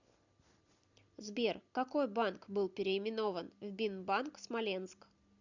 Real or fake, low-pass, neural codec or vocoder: real; 7.2 kHz; none